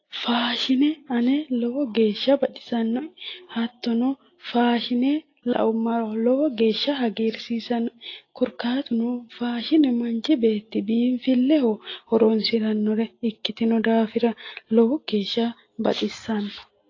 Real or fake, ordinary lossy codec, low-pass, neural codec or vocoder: real; AAC, 32 kbps; 7.2 kHz; none